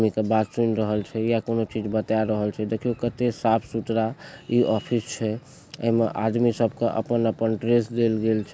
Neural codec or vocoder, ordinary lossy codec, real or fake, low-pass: none; none; real; none